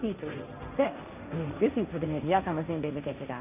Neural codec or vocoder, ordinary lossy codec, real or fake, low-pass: codec, 16 kHz, 1.1 kbps, Voila-Tokenizer; none; fake; 3.6 kHz